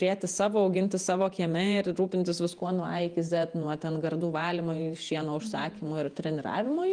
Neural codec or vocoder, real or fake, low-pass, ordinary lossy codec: vocoder, 48 kHz, 128 mel bands, Vocos; fake; 9.9 kHz; Opus, 32 kbps